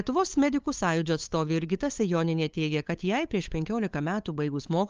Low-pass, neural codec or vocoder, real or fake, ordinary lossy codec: 7.2 kHz; codec, 16 kHz, 8 kbps, FunCodec, trained on LibriTTS, 25 frames a second; fake; Opus, 24 kbps